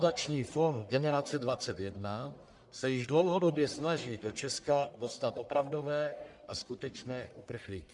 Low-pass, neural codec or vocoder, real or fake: 10.8 kHz; codec, 44.1 kHz, 1.7 kbps, Pupu-Codec; fake